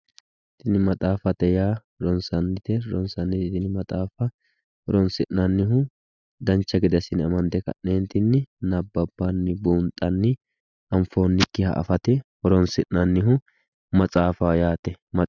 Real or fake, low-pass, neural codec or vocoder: real; 7.2 kHz; none